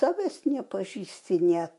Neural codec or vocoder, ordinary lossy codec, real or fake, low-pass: autoencoder, 48 kHz, 128 numbers a frame, DAC-VAE, trained on Japanese speech; MP3, 48 kbps; fake; 14.4 kHz